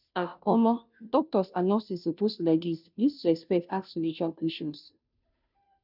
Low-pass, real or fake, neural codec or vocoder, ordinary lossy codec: 5.4 kHz; fake; codec, 16 kHz, 0.5 kbps, FunCodec, trained on Chinese and English, 25 frames a second; none